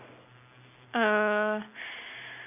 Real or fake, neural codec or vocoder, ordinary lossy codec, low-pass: real; none; none; 3.6 kHz